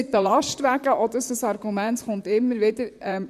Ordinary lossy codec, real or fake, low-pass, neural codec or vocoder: none; fake; 14.4 kHz; vocoder, 44.1 kHz, 128 mel bands, Pupu-Vocoder